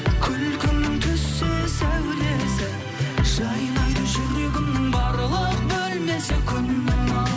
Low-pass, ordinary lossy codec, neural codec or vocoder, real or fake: none; none; none; real